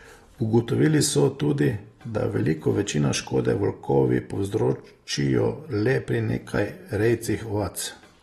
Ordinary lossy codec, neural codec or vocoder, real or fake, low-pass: AAC, 32 kbps; none; real; 19.8 kHz